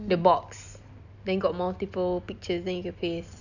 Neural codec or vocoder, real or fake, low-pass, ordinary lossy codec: none; real; 7.2 kHz; none